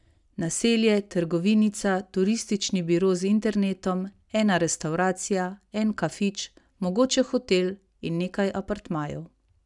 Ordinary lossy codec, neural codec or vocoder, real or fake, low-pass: none; none; real; 10.8 kHz